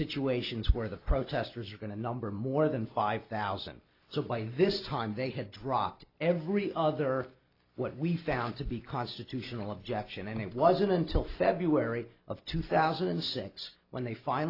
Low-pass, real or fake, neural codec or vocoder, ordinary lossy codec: 5.4 kHz; real; none; AAC, 32 kbps